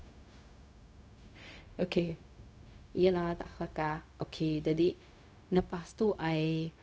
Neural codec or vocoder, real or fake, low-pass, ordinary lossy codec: codec, 16 kHz, 0.4 kbps, LongCat-Audio-Codec; fake; none; none